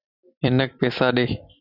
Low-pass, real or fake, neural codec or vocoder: 5.4 kHz; fake; vocoder, 44.1 kHz, 128 mel bands every 256 samples, BigVGAN v2